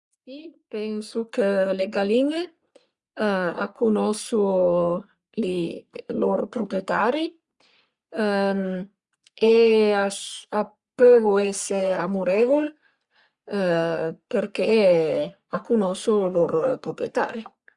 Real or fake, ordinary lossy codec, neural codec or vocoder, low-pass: fake; Opus, 64 kbps; codec, 44.1 kHz, 3.4 kbps, Pupu-Codec; 10.8 kHz